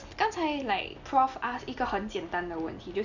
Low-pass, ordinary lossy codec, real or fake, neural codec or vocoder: 7.2 kHz; none; real; none